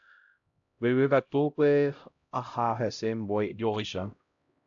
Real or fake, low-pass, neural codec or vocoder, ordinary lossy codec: fake; 7.2 kHz; codec, 16 kHz, 0.5 kbps, X-Codec, HuBERT features, trained on LibriSpeech; AAC, 64 kbps